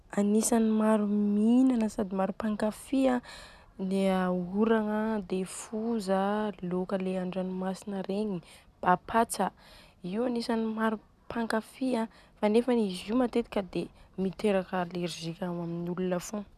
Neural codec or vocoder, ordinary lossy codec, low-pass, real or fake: none; none; 14.4 kHz; real